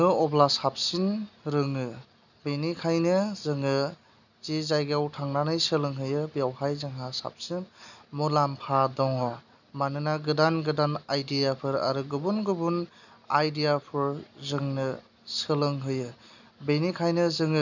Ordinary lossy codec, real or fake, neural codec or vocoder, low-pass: none; real; none; 7.2 kHz